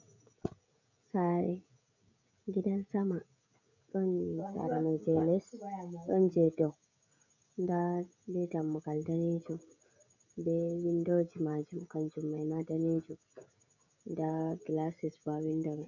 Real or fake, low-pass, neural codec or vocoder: fake; 7.2 kHz; codec, 16 kHz, 16 kbps, FreqCodec, smaller model